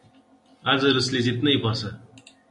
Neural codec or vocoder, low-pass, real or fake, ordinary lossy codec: none; 10.8 kHz; real; MP3, 48 kbps